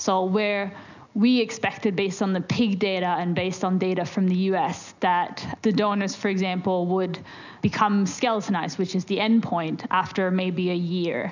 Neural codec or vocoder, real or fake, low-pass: none; real; 7.2 kHz